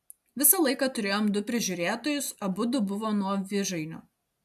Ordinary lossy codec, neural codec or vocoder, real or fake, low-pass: AAC, 96 kbps; none; real; 14.4 kHz